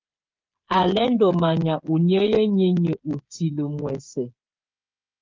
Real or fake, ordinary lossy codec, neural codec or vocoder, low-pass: fake; Opus, 24 kbps; codec, 16 kHz, 8 kbps, FreqCodec, smaller model; 7.2 kHz